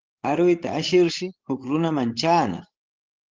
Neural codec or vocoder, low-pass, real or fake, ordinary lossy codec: codec, 16 kHz, 16 kbps, FreqCodec, larger model; 7.2 kHz; fake; Opus, 16 kbps